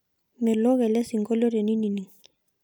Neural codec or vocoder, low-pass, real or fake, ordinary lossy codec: none; none; real; none